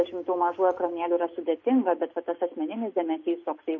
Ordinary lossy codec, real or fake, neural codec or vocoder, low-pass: MP3, 32 kbps; real; none; 7.2 kHz